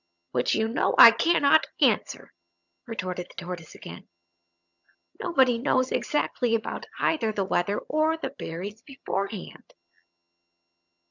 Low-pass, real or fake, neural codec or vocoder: 7.2 kHz; fake; vocoder, 22.05 kHz, 80 mel bands, HiFi-GAN